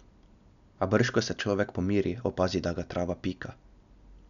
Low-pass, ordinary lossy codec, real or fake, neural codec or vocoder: 7.2 kHz; none; real; none